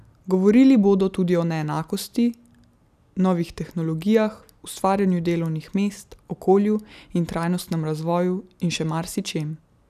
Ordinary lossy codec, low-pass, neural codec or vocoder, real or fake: none; 14.4 kHz; none; real